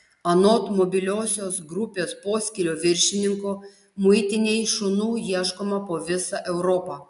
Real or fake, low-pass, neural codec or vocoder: real; 10.8 kHz; none